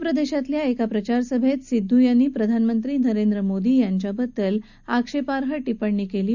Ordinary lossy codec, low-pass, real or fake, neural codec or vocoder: none; 7.2 kHz; real; none